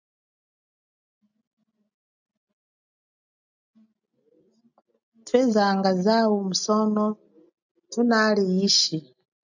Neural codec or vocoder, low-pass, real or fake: none; 7.2 kHz; real